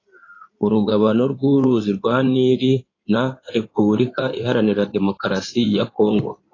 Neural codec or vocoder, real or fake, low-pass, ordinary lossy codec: codec, 16 kHz in and 24 kHz out, 2.2 kbps, FireRedTTS-2 codec; fake; 7.2 kHz; AAC, 32 kbps